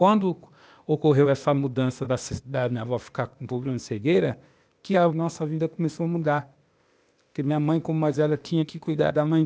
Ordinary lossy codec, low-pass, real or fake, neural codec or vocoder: none; none; fake; codec, 16 kHz, 0.8 kbps, ZipCodec